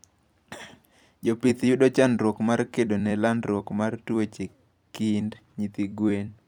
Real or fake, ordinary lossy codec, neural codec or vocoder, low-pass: fake; none; vocoder, 44.1 kHz, 128 mel bands every 256 samples, BigVGAN v2; 19.8 kHz